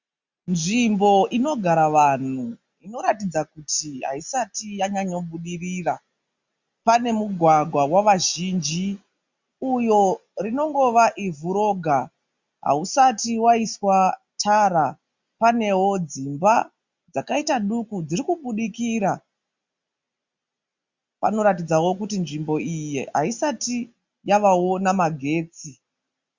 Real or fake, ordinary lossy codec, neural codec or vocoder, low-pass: real; Opus, 64 kbps; none; 7.2 kHz